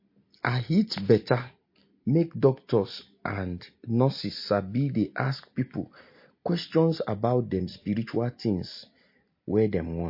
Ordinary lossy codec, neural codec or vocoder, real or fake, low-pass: MP3, 32 kbps; none; real; 5.4 kHz